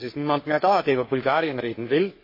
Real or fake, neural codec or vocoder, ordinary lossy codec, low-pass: fake; codec, 44.1 kHz, 3.4 kbps, Pupu-Codec; MP3, 24 kbps; 5.4 kHz